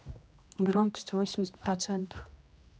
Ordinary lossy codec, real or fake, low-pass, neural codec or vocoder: none; fake; none; codec, 16 kHz, 1 kbps, X-Codec, HuBERT features, trained on general audio